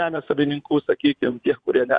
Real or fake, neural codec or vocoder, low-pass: fake; vocoder, 24 kHz, 100 mel bands, Vocos; 9.9 kHz